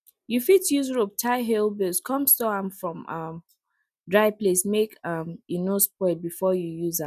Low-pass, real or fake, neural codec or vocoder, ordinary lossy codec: 14.4 kHz; real; none; none